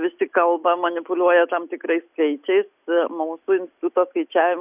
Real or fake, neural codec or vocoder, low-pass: real; none; 3.6 kHz